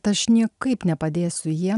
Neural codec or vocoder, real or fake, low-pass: none; real; 10.8 kHz